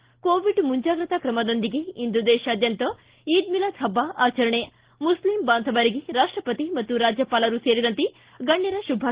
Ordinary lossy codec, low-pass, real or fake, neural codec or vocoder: Opus, 16 kbps; 3.6 kHz; real; none